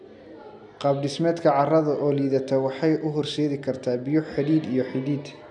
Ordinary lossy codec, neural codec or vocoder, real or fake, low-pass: none; none; real; 10.8 kHz